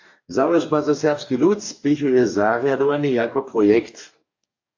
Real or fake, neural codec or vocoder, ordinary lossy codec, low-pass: fake; codec, 44.1 kHz, 2.6 kbps, DAC; AAC, 48 kbps; 7.2 kHz